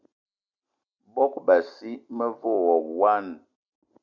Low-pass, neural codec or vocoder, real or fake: 7.2 kHz; none; real